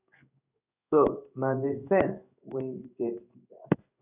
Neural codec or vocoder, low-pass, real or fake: codec, 16 kHz in and 24 kHz out, 1 kbps, XY-Tokenizer; 3.6 kHz; fake